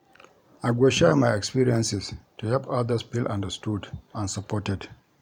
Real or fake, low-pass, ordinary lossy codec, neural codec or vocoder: fake; 19.8 kHz; none; vocoder, 44.1 kHz, 128 mel bands every 512 samples, BigVGAN v2